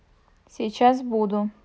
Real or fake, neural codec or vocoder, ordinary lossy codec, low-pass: real; none; none; none